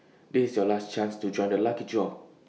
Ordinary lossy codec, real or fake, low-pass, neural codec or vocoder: none; real; none; none